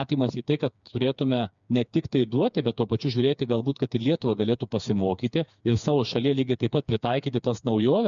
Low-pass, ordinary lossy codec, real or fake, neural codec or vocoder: 7.2 kHz; MP3, 96 kbps; fake; codec, 16 kHz, 4 kbps, FreqCodec, smaller model